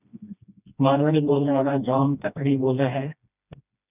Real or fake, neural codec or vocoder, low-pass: fake; codec, 16 kHz, 1 kbps, FreqCodec, smaller model; 3.6 kHz